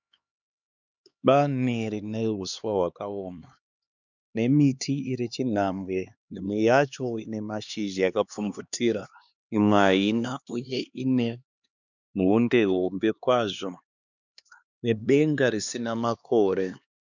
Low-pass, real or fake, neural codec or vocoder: 7.2 kHz; fake; codec, 16 kHz, 2 kbps, X-Codec, HuBERT features, trained on LibriSpeech